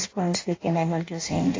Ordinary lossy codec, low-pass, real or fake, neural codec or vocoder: AAC, 32 kbps; 7.2 kHz; fake; codec, 16 kHz in and 24 kHz out, 0.6 kbps, FireRedTTS-2 codec